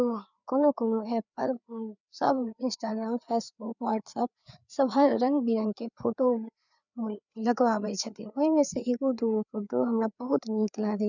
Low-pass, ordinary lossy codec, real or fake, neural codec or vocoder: 7.2 kHz; none; fake; codec, 16 kHz, 4 kbps, FreqCodec, larger model